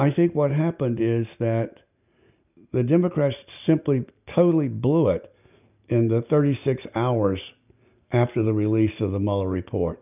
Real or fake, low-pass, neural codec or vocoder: real; 3.6 kHz; none